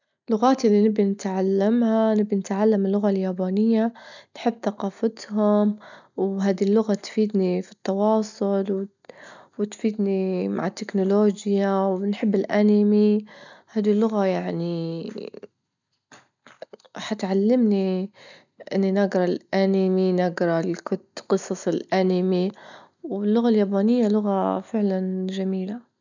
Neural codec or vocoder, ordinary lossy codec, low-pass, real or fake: none; none; 7.2 kHz; real